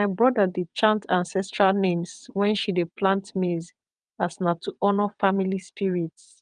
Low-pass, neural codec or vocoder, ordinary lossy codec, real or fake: 9.9 kHz; vocoder, 22.05 kHz, 80 mel bands, WaveNeXt; Opus, 32 kbps; fake